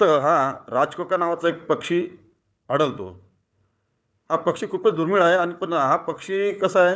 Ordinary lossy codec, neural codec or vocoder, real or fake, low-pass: none; codec, 16 kHz, 4 kbps, FunCodec, trained on Chinese and English, 50 frames a second; fake; none